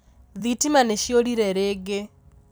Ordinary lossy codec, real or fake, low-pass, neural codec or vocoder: none; fake; none; vocoder, 44.1 kHz, 128 mel bands every 256 samples, BigVGAN v2